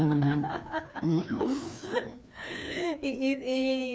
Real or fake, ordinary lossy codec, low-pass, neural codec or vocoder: fake; none; none; codec, 16 kHz, 2 kbps, FreqCodec, larger model